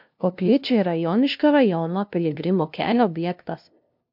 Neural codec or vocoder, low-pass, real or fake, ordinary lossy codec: codec, 16 kHz, 0.5 kbps, FunCodec, trained on LibriTTS, 25 frames a second; 5.4 kHz; fake; MP3, 48 kbps